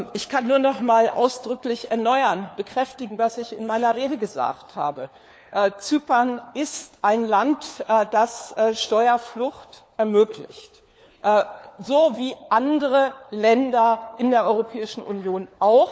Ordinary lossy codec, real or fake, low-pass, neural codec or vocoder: none; fake; none; codec, 16 kHz, 4 kbps, FunCodec, trained on LibriTTS, 50 frames a second